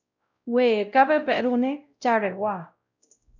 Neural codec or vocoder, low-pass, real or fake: codec, 16 kHz, 0.5 kbps, X-Codec, WavLM features, trained on Multilingual LibriSpeech; 7.2 kHz; fake